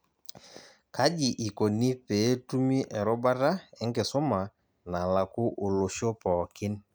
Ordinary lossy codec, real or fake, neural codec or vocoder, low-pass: none; real; none; none